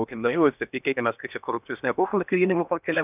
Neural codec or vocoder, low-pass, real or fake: codec, 16 kHz in and 24 kHz out, 0.8 kbps, FocalCodec, streaming, 65536 codes; 3.6 kHz; fake